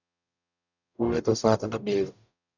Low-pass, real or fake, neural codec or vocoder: 7.2 kHz; fake; codec, 44.1 kHz, 0.9 kbps, DAC